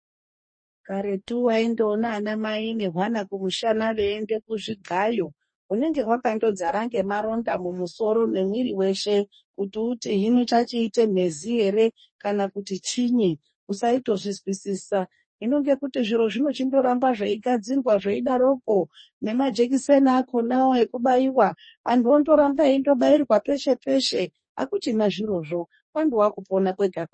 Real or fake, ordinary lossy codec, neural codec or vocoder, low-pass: fake; MP3, 32 kbps; codec, 44.1 kHz, 2.6 kbps, DAC; 9.9 kHz